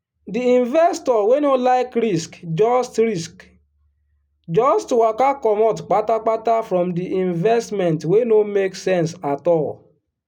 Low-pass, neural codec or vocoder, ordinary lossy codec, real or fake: 19.8 kHz; none; none; real